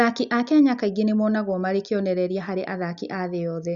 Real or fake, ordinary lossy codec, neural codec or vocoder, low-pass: real; Opus, 64 kbps; none; 7.2 kHz